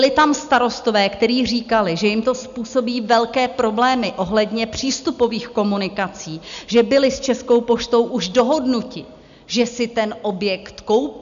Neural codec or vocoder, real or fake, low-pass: none; real; 7.2 kHz